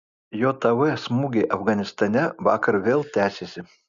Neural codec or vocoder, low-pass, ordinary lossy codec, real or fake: none; 7.2 kHz; Opus, 64 kbps; real